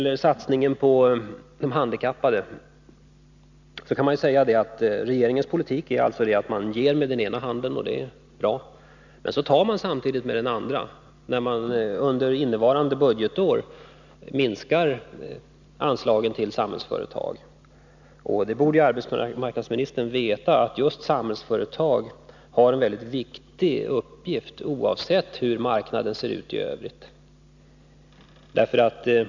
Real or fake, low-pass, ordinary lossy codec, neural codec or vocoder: real; 7.2 kHz; none; none